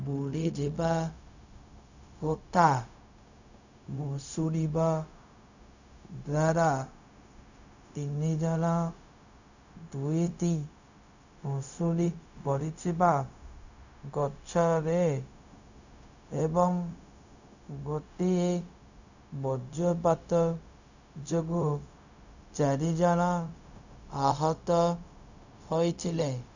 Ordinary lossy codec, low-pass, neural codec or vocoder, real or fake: none; 7.2 kHz; codec, 16 kHz, 0.4 kbps, LongCat-Audio-Codec; fake